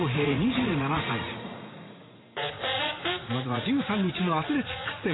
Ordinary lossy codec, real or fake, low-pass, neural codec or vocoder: AAC, 16 kbps; fake; 7.2 kHz; vocoder, 44.1 kHz, 80 mel bands, Vocos